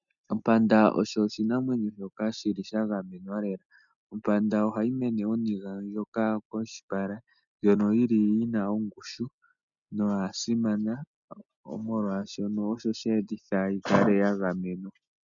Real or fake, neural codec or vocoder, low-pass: real; none; 7.2 kHz